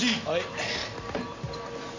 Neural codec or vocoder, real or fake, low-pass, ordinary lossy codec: none; real; 7.2 kHz; MP3, 64 kbps